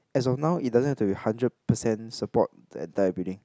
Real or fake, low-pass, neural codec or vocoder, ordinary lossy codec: real; none; none; none